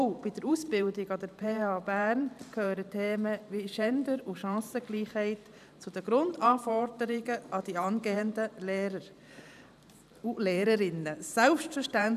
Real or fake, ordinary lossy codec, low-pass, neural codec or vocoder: fake; none; 14.4 kHz; vocoder, 44.1 kHz, 128 mel bands every 512 samples, BigVGAN v2